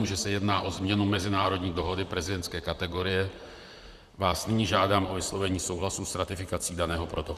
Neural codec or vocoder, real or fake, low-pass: vocoder, 44.1 kHz, 128 mel bands, Pupu-Vocoder; fake; 14.4 kHz